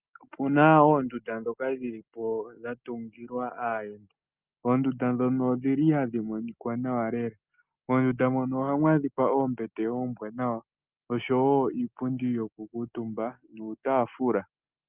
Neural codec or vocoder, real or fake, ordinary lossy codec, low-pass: none; real; Opus, 24 kbps; 3.6 kHz